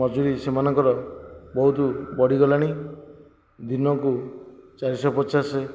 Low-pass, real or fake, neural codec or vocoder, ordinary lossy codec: none; real; none; none